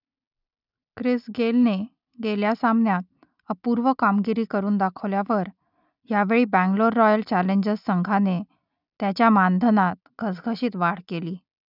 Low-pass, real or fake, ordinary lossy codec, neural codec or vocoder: 5.4 kHz; real; none; none